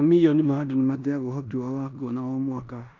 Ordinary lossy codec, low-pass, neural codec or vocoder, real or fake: none; 7.2 kHz; codec, 16 kHz in and 24 kHz out, 0.9 kbps, LongCat-Audio-Codec, four codebook decoder; fake